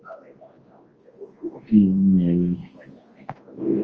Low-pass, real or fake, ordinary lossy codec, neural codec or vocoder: 7.2 kHz; fake; Opus, 24 kbps; codec, 24 kHz, 0.9 kbps, WavTokenizer, large speech release